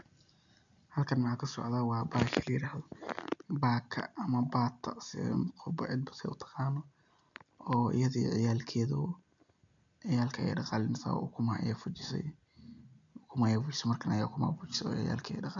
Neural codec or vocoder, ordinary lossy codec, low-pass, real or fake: none; none; 7.2 kHz; real